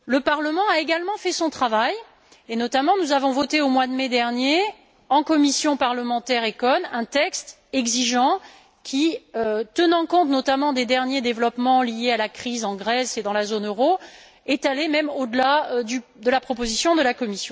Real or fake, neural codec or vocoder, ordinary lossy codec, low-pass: real; none; none; none